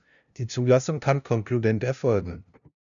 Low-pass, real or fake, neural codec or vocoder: 7.2 kHz; fake; codec, 16 kHz, 0.5 kbps, FunCodec, trained on LibriTTS, 25 frames a second